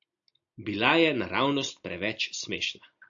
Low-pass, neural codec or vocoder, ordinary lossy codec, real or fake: 7.2 kHz; none; AAC, 64 kbps; real